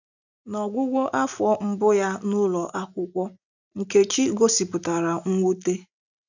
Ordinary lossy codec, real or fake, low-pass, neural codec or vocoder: none; real; 7.2 kHz; none